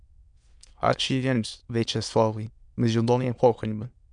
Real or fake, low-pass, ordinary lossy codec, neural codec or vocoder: fake; 9.9 kHz; MP3, 96 kbps; autoencoder, 22.05 kHz, a latent of 192 numbers a frame, VITS, trained on many speakers